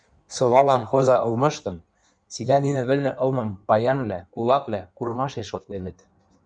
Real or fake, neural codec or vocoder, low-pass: fake; codec, 16 kHz in and 24 kHz out, 1.1 kbps, FireRedTTS-2 codec; 9.9 kHz